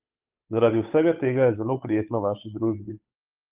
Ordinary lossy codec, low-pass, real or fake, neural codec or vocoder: Opus, 24 kbps; 3.6 kHz; fake; codec, 16 kHz, 8 kbps, FunCodec, trained on Chinese and English, 25 frames a second